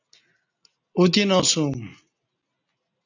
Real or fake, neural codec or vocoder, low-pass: real; none; 7.2 kHz